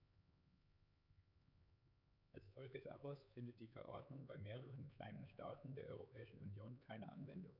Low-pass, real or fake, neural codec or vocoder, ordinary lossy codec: 5.4 kHz; fake; codec, 16 kHz, 4 kbps, X-Codec, HuBERT features, trained on LibriSpeech; none